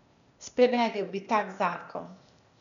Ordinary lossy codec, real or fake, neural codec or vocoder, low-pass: none; fake; codec, 16 kHz, 0.8 kbps, ZipCodec; 7.2 kHz